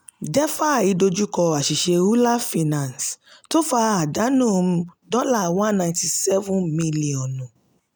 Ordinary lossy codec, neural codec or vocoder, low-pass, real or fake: none; none; none; real